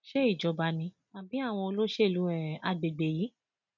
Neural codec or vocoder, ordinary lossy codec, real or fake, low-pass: none; none; real; 7.2 kHz